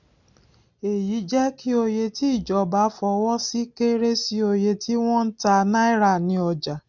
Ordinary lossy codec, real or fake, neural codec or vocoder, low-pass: none; real; none; 7.2 kHz